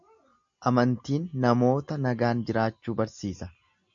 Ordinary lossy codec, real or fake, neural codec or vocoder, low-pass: MP3, 64 kbps; real; none; 7.2 kHz